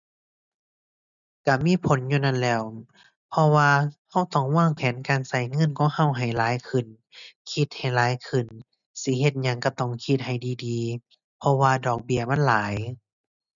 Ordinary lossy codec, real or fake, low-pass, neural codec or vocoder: none; real; 7.2 kHz; none